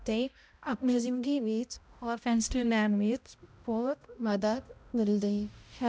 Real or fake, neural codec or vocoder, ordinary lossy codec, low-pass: fake; codec, 16 kHz, 0.5 kbps, X-Codec, HuBERT features, trained on balanced general audio; none; none